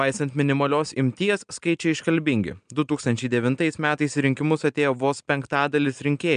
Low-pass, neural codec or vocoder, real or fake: 9.9 kHz; none; real